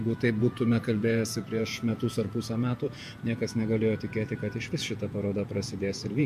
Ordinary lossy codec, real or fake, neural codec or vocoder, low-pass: MP3, 64 kbps; fake; vocoder, 48 kHz, 128 mel bands, Vocos; 14.4 kHz